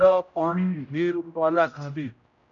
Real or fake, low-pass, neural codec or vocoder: fake; 7.2 kHz; codec, 16 kHz, 0.5 kbps, X-Codec, HuBERT features, trained on general audio